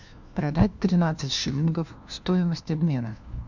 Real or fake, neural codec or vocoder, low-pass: fake; codec, 16 kHz, 1 kbps, FunCodec, trained on LibriTTS, 50 frames a second; 7.2 kHz